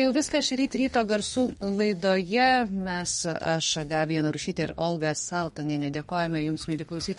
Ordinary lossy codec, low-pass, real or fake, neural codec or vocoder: MP3, 48 kbps; 14.4 kHz; fake; codec, 32 kHz, 1.9 kbps, SNAC